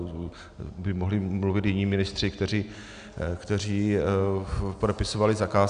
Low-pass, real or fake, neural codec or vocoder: 9.9 kHz; real; none